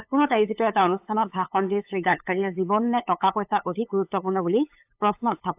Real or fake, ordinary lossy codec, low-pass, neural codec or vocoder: fake; AAC, 32 kbps; 3.6 kHz; codec, 16 kHz, 8 kbps, FunCodec, trained on LibriTTS, 25 frames a second